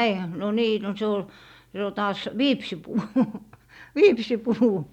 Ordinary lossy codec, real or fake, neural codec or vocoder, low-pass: none; real; none; 19.8 kHz